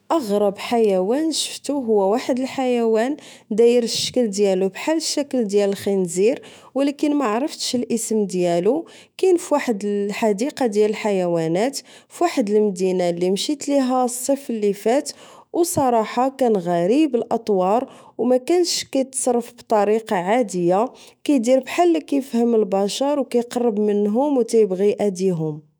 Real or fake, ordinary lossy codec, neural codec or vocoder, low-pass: fake; none; autoencoder, 48 kHz, 128 numbers a frame, DAC-VAE, trained on Japanese speech; none